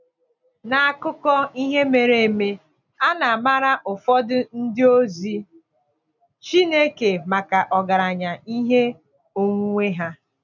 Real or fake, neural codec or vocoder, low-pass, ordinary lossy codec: real; none; 7.2 kHz; none